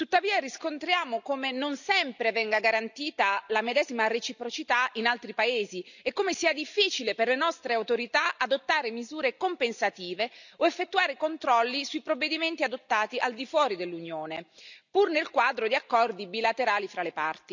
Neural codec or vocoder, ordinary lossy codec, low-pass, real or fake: none; none; 7.2 kHz; real